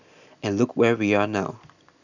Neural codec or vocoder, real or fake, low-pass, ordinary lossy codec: none; real; 7.2 kHz; none